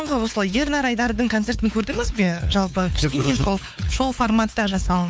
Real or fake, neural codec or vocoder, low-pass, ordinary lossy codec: fake; codec, 16 kHz, 4 kbps, X-Codec, WavLM features, trained on Multilingual LibriSpeech; none; none